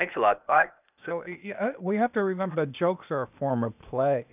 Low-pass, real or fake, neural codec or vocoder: 3.6 kHz; fake; codec, 16 kHz, 0.8 kbps, ZipCodec